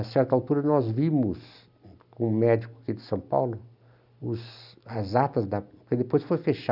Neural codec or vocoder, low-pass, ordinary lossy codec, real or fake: none; 5.4 kHz; none; real